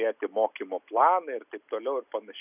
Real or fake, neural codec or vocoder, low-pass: real; none; 3.6 kHz